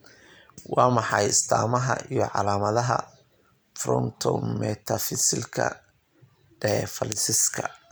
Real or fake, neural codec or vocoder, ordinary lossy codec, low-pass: fake; vocoder, 44.1 kHz, 128 mel bands every 256 samples, BigVGAN v2; none; none